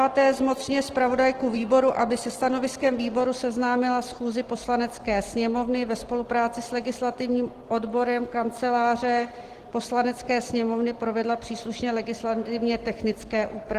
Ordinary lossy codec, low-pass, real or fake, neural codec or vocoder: Opus, 16 kbps; 14.4 kHz; real; none